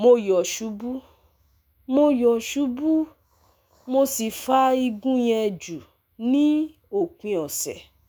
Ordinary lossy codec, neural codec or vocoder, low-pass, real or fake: none; autoencoder, 48 kHz, 128 numbers a frame, DAC-VAE, trained on Japanese speech; none; fake